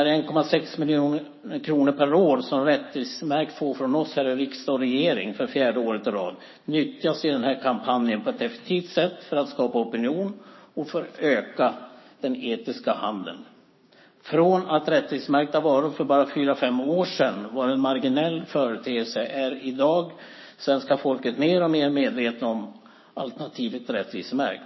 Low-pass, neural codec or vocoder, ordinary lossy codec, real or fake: 7.2 kHz; codec, 16 kHz, 6 kbps, DAC; MP3, 24 kbps; fake